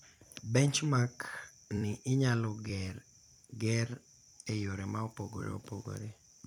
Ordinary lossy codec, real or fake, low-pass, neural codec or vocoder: none; fake; 19.8 kHz; vocoder, 44.1 kHz, 128 mel bands every 256 samples, BigVGAN v2